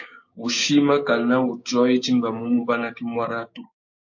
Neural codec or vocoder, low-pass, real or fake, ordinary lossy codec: codec, 16 kHz, 6 kbps, DAC; 7.2 kHz; fake; MP3, 48 kbps